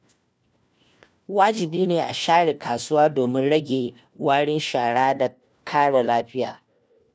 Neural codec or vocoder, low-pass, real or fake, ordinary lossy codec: codec, 16 kHz, 1 kbps, FunCodec, trained on LibriTTS, 50 frames a second; none; fake; none